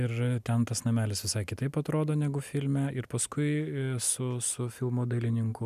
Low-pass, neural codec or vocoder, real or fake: 14.4 kHz; none; real